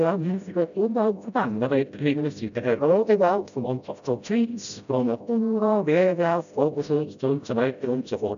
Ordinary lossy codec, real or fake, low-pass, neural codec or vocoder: none; fake; 7.2 kHz; codec, 16 kHz, 0.5 kbps, FreqCodec, smaller model